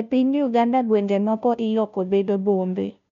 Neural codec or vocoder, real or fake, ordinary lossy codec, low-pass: codec, 16 kHz, 0.5 kbps, FunCodec, trained on Chinese and English, 25 frames a second; fake; none; 7.2 kHz